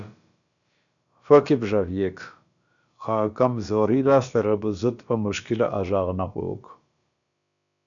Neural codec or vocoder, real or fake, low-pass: codec, 16 kHz, about 1 kbps, DyCAST, with the encoder's durations; fake; 7.2 kHz